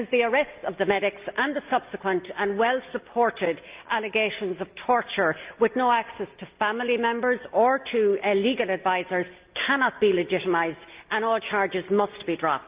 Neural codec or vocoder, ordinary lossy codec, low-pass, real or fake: none; Opus, 24 kbps; 3.6 kHz; real